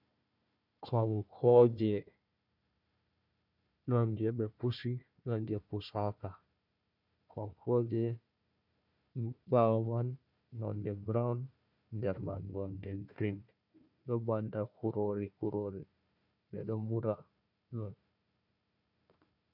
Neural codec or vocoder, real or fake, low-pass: codec, 16 kHz, 1 kbps, FunCodec, trained on Chinese and English, 50 frames a second; fake; 5.4 kHz